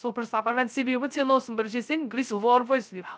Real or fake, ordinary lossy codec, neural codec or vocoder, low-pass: fake; none; codec, 16 kHz, 0.3 kbps, FocalCodec; none